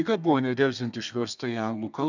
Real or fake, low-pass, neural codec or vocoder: fake; 7.2 kHz; codec, 32 kHz, 1.9 kbps, SNAC